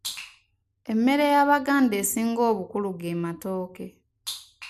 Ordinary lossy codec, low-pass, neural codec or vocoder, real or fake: MP3, 96 kbps; 14.4 kHz; none; real